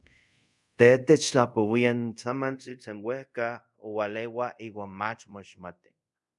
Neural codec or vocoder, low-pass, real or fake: codec, 24 kHz, 0.5 kbps, DualCodec; 10.8 kHz; fake